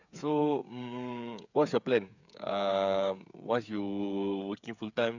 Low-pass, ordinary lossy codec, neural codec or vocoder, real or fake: 7.2 kHz; none; codec, 16 kHz, 8 kbps, FreqCodec, smaller model; fake